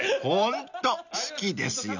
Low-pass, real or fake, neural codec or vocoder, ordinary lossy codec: 7.2 kHz; real; none; none